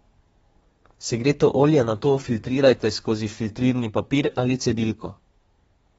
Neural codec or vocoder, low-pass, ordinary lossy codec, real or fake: codec, 32 kHz, 1.9 kbps, SNAC; 14.4 kHz; AAC, 24 kbps; fake